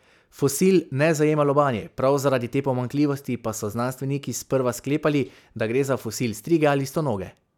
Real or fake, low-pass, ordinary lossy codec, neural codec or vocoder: real; 19.8 kHz; none; none